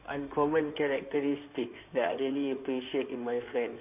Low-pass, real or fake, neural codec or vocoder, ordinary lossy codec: 3.6 kHz; fake; codec, 16 kHz in and 24 kHz out, 2.2 kbps, FireRedTTS-2 codec; none